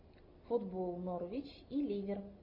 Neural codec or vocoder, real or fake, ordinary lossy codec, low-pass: none; real; MP3, 32 kbps; 5.4 kHz